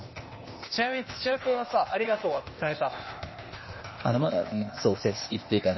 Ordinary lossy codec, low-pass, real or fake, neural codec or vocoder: MP3, 24 kbps; 7.2 kHz; fake; codec, 16 kHz, 0.8 kbps, ZipCodec